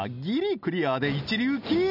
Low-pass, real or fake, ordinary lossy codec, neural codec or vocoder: 5.4 kHz; real; none; none